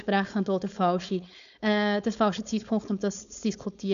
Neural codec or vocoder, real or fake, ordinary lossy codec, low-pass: codec, 16 kHz, 4.8 kbps, FACodec; fake; none; 7.2 kHz